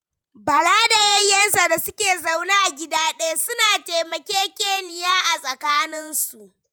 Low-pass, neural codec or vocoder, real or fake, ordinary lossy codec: none; vocoder, 48 kHz, 128 mel bands, Vocos; fake; none